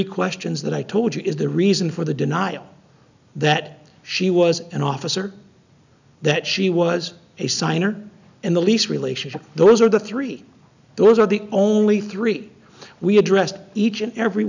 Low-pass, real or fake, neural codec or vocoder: 7.2 kHz; real; none